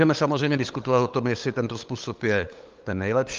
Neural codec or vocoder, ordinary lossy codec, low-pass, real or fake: codec, 16 kHz, 8 kbps, FunCodec, trained on LibriTTS, 25 frames a second; Opus, 32 kbps; 7.2 kHz; fake